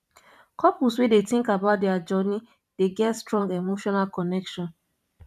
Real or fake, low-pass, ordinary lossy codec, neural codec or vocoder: fake; 14.4 kHz; none; vocoder, 44.1 kHz, 128 mel bands every 512 samples, BigVGAN v2